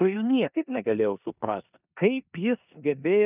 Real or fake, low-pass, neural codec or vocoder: fake; 3.6 kHz; codec, 16 kHz in and 24 kHz out, 0.9 kbps, LongCat-Audio-Codec, four codebook decoder